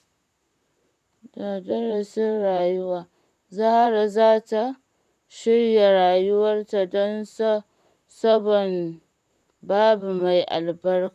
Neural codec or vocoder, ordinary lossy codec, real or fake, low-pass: vocoder, 44.1 kHz, 128 mel bands, Pupu-Vocoder; none; fake; 14.4 kHz